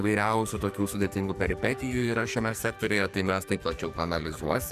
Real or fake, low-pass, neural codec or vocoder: fake; 14.4 kHz; codec, 44.1 kHz, 2.6 kbps, SNAC